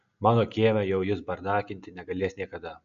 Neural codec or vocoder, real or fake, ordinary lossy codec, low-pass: none; real; AAC, 96 kbps; 7.2 kHz